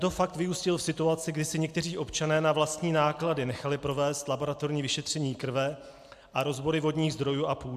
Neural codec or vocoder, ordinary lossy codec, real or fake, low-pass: none; AAC, 96 kbps; real; 14.4 kHz